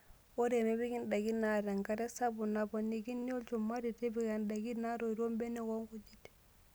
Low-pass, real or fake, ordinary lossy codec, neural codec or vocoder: none; real; none; none